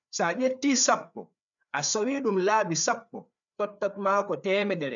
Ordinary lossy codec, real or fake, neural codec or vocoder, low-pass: none; fake; codec, 16 kHz, 4 kbps, FreqCodec, larger model; 7.2 kHz